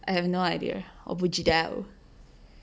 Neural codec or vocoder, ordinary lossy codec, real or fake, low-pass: none; none; real; none